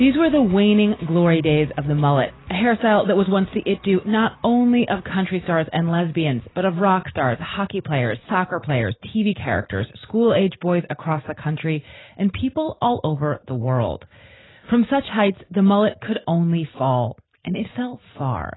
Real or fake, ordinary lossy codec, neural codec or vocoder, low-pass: real; AAC, 16 kbps; none; 7.2 kHz